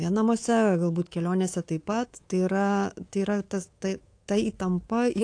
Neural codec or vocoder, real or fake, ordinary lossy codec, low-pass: codec, 24 kHz, 3.1 kbps, DualCodec; fake; AAC, 48 kbps; 9.9 kHz